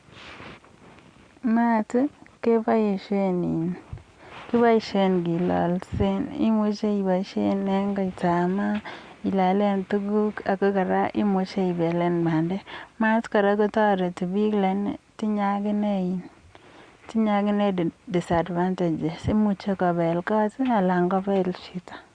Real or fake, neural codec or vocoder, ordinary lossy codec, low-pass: real; none; Opus, 64 kbps; 9.9 kHz